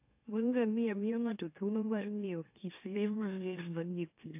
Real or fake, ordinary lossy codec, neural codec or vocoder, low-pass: fake; AAC, 32 kbps; autoencoder, 44.1 kHz, a latent of 192 numbers a frame, MeloTTS; 3.6 kHz